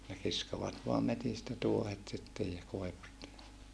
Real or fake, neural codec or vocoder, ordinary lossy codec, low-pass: real; none; none; none